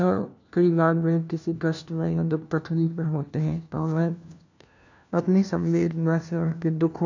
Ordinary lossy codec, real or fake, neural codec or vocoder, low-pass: none; fake; codec, 16 kHz, 1 kbps, FunCodec, trained on LibriTTS, 50 frames a second; 7.2 kHz